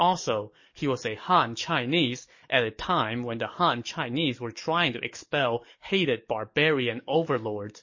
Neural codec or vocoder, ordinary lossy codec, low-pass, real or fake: codec, 44.1 kHz, 7.8 kbps, DAC; MP3, 32 kbps; 7.2 kHz; fake